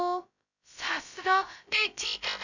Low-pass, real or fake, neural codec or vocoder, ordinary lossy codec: 7.2 kHz; fake; codec, 16 kHz, 0.2 kbps, FocalCodec; none